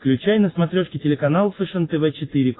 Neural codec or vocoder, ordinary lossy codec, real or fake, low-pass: none; AAC, 16 kbps; real; 7.2 kHz